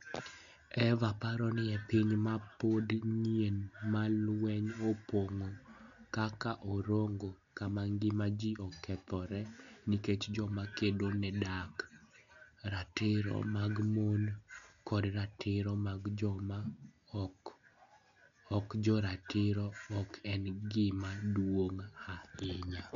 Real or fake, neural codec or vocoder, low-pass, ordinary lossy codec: real; none; 7.2 kHz; none